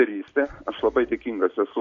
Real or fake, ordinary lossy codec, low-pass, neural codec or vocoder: real; AAC, 48 kbps; 7.2 kHz; none